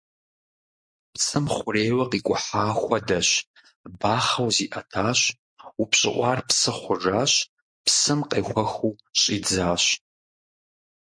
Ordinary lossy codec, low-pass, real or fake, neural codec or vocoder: MP3, 48 kbps; 9.9 kHz; real; none